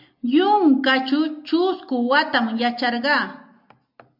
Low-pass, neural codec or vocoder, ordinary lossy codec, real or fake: 5.4 kHz; none; MP3, 48 kbps; real